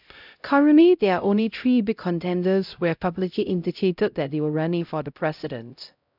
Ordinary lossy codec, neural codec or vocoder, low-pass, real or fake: none; codec, 16 kHz, 0.5 kbps, X-Codec, HuBERT features, trained on LibriSpeech; 5.4 kHz; fake